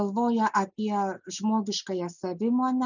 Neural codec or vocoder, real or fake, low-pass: none; real; 7.2 kHz